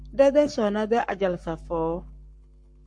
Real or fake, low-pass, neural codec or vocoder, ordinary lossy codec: fake; 9.9 kHz; codec, 44.1 kHz, 7.8 kbps, Pupu-Codec; MP3, 48 kbps